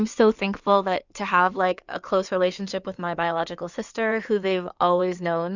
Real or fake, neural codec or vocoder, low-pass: fake; codec, 16 kHz in and 24 kHz out, 2.2 kbps, FireRedTTS-2 codec; 7.2 kHz